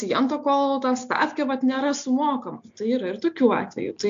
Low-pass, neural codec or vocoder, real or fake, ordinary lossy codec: 7.2 kHz; none; real; AAC, 64 kbps